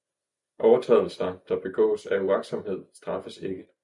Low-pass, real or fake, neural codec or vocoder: 10.8 kHz; real; none